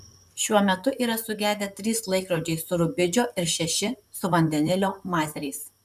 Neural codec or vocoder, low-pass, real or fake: vocoder, 44.1 kHz, 128 mel bands, Pupu-Vocoder; 14.4 kHz; fake